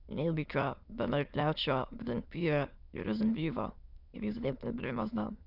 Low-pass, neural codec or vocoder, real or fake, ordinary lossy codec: 5.4 kHz; autoencoder, 22.05 kHz, a latent of 192 numbers a frame, VITS, trained on many speakers; fake; none